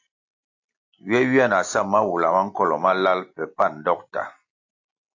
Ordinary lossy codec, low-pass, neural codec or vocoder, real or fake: AAC, 48 kbps; 7.2 kHz; none; real